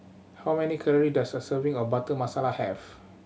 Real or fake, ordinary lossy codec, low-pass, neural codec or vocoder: real; none; none; none